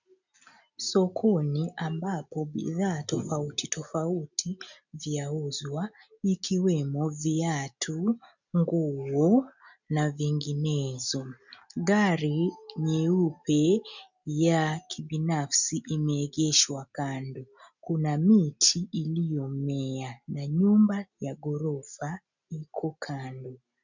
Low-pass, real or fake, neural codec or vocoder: 7.2 kHz; real; none